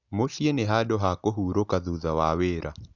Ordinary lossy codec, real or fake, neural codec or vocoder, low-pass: none; real; none; 7.2 kHz